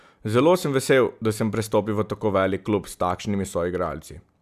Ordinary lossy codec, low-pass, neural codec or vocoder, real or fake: none; 14.4 kHz; none; real